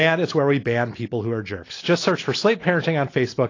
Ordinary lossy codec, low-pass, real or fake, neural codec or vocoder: AAC, 32 kbps; 7.2 kHz; real; none